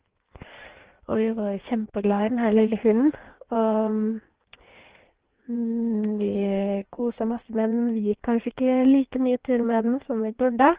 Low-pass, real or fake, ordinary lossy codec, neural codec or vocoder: 3.6 kHz; fake; Opus, 16 kbps; codec, 16 kHz in and 24 kHz out, 1.1 kbps, FireRedTTS-2 codec